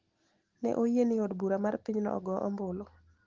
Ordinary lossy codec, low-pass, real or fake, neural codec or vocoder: Opus, 16 kbps; 7.2 kHz; real; none